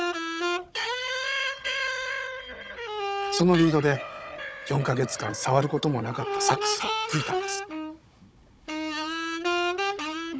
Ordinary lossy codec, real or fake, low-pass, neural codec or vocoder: none; fake; none; codec, 16 kHz, 16 kbps, FunCodec, trained on Chinese and English, 50 frames a second